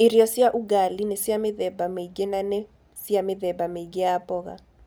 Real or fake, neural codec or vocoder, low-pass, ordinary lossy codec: real; none; none; none